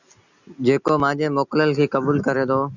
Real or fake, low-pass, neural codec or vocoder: real; 7.2 kHz; none